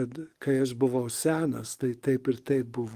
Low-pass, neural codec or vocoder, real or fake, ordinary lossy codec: 14.4 kHz; codec, 44.1 kHz, 7.8 kbps, DAC; fake; Opus, 24 kbps